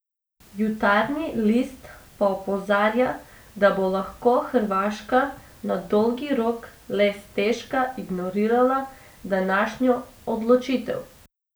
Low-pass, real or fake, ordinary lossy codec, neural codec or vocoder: none; real; none; none